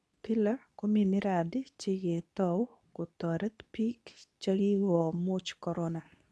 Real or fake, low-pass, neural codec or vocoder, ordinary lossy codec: fake; none; codec, 24 kHz, 0.9 kbps, WavTokenizer, medium speech release version 2; none